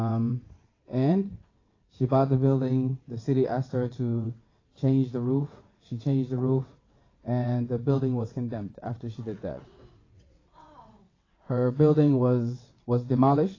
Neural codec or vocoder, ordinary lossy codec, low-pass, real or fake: vocoder, 22.05 kHz, 80 mel bands, WaveNeXt; AAC, 32 kbps; 7.2 kHz; fake